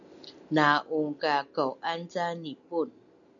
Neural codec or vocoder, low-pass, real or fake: none; 7.2 kHz; real